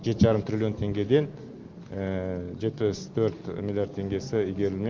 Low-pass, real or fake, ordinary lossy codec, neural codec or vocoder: 7.2 kHz; real; Opus, 32 kbps; none